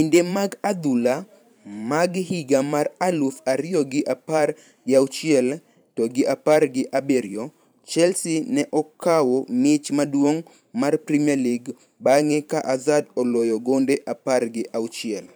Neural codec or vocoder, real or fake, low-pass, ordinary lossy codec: vocoder, 44.1 kHz, 128 mel bands every 512 samples, BigVGAN v2; fake; none; none